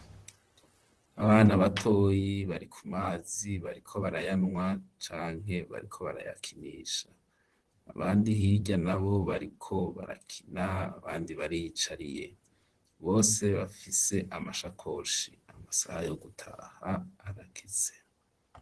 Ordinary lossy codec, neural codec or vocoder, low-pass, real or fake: Opus, 16 kbps; vocoder, 44.1 kHz, 128 mel bands, Pupu-Vocoder; 10.8 kHz; fake